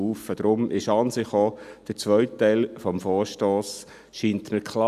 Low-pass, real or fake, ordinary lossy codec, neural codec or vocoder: 14.4 kHz; real; none; none